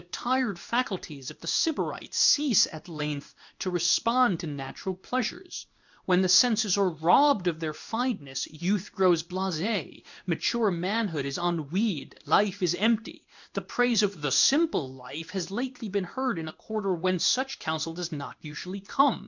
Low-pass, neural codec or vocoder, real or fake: 7.2 kHz; codec, 16 kHz in and 24 kHz out, 1 kbps, XY-Tokenizer; fake